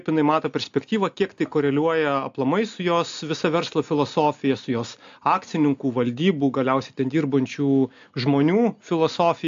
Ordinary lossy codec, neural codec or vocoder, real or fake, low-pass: AAC, 64 kbps; none; real; 7.2 kHz